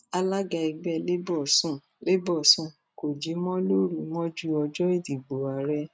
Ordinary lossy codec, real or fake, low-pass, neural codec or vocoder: none; real; none; none